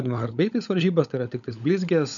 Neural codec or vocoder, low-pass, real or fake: codec, 16 kHz, 16 kbps, FunCodec, trained on LibriTTS, 50 frames a second; 7.2 kHz; fake